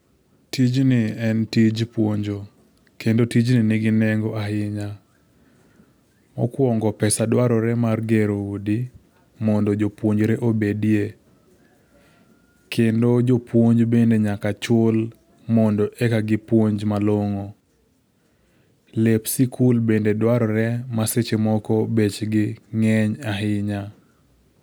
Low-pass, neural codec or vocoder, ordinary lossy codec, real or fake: none; none; none; real